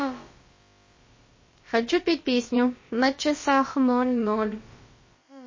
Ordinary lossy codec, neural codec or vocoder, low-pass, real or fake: MP3, 32 kbps; codec, 16 kHz, about 1 kbps, DyCAST, with the encoder's durations; 7.2 kHz; fake